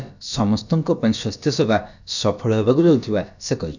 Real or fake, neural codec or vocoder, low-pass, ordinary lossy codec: fake; codec, 16 kHz, about 1 kbps, DyCAST, with the encoder's durations; 7.2 kHz; none